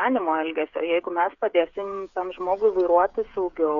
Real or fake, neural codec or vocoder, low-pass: fake; codec, 16 kHz, 8 kbps, FreqCodec, smaller model; 7.2 kHz